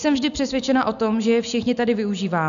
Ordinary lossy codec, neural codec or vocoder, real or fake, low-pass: AAC, 96 kbps; none; real; 7.2 kHz